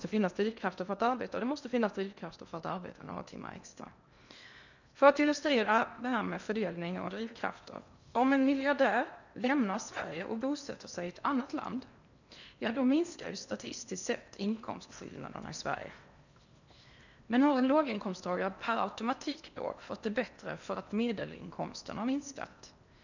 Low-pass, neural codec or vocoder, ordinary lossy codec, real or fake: 7.2 kHz; codec, 16 kHz in and 24 kHz out, 0.8 kbps, FocalCodec, streaming, 65536 codes; none; fake